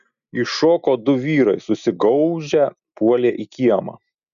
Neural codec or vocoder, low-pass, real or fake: none; 7.2 kHz; real